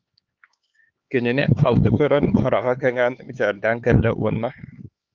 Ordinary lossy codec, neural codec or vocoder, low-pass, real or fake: Opus, 24 kbps; codec, 16 kHz, 4 kbps, X-Codec, HuBERT features, trained on LibriSpeech; 7.2 kHz; fake